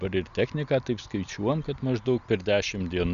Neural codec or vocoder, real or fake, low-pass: none; real; 7.2 kHz